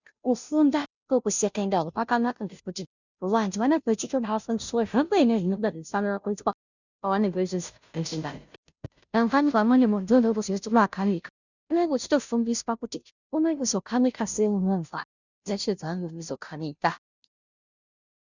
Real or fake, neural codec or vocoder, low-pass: fake; codec, 16 kHz, 0.5 kbps, FunCodec, trained on Chinese and English, 25 frames a second; 7.2 kHz